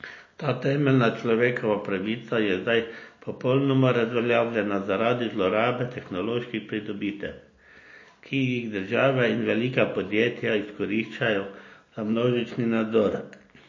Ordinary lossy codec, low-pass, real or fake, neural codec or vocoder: MP3, 32 kbps; 7.2 kHz; real; none